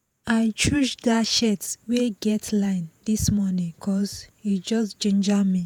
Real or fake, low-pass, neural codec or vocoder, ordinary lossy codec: fake; none; vocoder, 48 kHz, 128 mel bands, Vocos; none